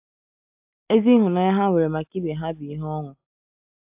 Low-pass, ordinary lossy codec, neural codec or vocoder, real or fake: 3.6 kHz; AAC, 32 kbps; none; real